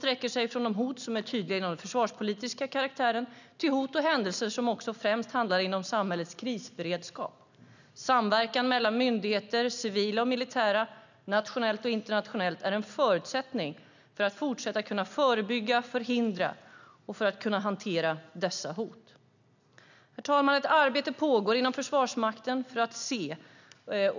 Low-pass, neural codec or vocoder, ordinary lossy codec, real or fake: 7.2 kHz; none; none; real